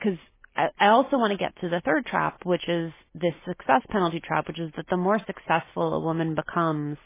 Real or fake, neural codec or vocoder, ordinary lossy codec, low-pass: real; none; MP3, 16 kbps; 3.6 kHz